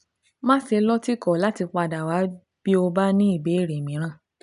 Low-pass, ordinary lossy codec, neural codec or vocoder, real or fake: 10.8 kHz; none; none; real